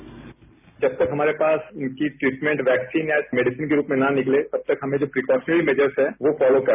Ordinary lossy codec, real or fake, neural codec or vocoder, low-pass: none; real; none; 3.6 kHz